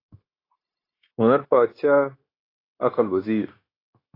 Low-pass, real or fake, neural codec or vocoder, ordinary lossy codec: 5.4 kHz; fake; codec, 16 kHz, 0.9 kbps, LongCat-Audio-Codec; AAC, 24 kbps